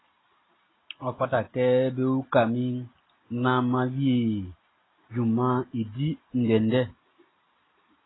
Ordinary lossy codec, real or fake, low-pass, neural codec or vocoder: AAC, 16 kbps; real; 7.2 kHz; none